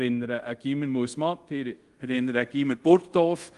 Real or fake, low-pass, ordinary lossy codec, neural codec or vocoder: fake; 10.8 kHz; Opus, 24 kbps; codec, 24 kHz, 0.5 kbps, DualCodec